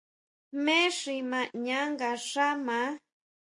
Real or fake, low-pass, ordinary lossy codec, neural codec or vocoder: real; 10.8 kHz; MP3, 48 kbps; none